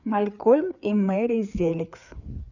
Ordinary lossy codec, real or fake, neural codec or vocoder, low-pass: none; fake; codec, 16 kHz, 4 kbps, FreqCodec, larger model; 7.2 kHz